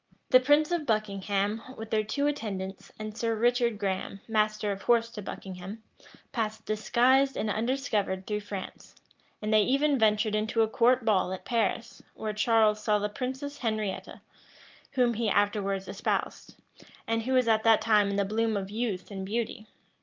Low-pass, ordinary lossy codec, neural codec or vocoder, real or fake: 7.2 kHz; Opus, 24 kbps; none; real